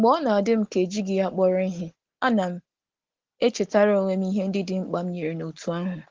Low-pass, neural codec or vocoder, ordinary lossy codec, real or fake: 7.2 kHz; none; Opus, 16 kbps; real